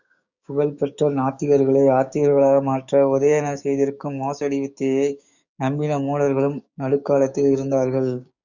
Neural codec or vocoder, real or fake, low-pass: codec, 16 kHz, 6 kbps, DAC; fake; 7.2 kHz